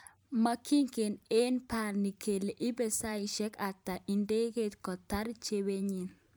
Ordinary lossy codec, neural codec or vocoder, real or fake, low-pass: none; vocoder, 44.1 kHz, 128 mel bands every 512 samples, BigVGAN v2; fake; none